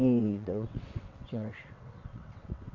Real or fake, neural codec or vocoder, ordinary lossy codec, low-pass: fake; vocoder, 22.05 kHz, 80 mel bands, WaveNeXt; none; 7.2 kHz